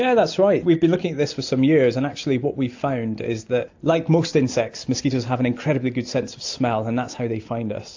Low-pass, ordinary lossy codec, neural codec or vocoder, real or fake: 7.2 kHz; AAC, 48 kbps; none; real